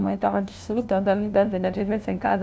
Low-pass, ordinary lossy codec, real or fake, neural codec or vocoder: none; none; fake; codec, 16 kHz, 0.5 kbps, FunCodec, trained on LibriTTS, 25 frames a second